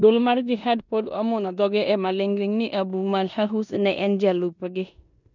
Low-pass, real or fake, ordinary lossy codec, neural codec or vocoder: 7.2 kHz; fake; none; codec, 16 kHz in and 24 kHz out, 0.9 kbps, LongCat-Audio-Codec, four codebook decoder